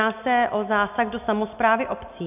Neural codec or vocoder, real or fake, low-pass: none; real; 3.6 kHz